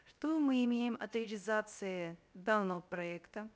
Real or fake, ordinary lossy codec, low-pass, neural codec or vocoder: fake; none; none; codec, 16 kHz, 0.3 kbps, FocalCodec